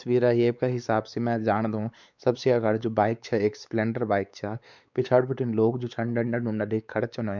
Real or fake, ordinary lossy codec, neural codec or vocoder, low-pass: fake; none; codec, 16 kHz, 4 kbps, X-Codec, WavLM features, trained on Multilingual LibriSpeech; 7.2 kHz